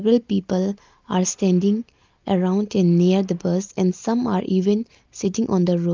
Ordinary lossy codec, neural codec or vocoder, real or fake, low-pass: Opus, 32 kbps; none; real; 7.2 kHz